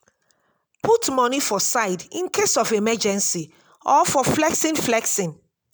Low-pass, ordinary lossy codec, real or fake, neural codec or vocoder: none; none; real; none